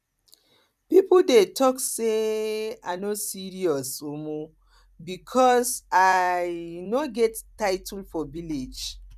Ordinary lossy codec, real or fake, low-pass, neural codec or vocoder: none; fake; 14.4 kHz; vocoder, 44.1 kHz, 128 mel bands every 256 samples, BigVGAN v2